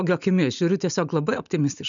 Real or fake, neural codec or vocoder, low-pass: real; none; 7.2 kHz